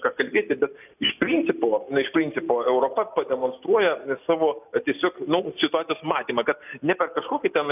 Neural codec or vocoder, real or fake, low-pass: none; real; 3.6 kHz